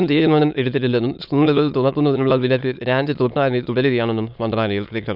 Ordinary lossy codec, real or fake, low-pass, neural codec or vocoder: none; fake; 5.4 kHz; autoencoder, 22.05 kHz, a latent of 192 numbers a frame, VITS, trained on many speakers